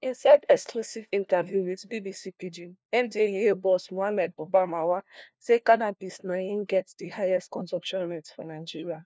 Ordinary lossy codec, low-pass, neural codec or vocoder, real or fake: none; none; codec, 16 kHz, 1 kbps, FunCodec, trained on LibriTTS, 50 frames a second; fake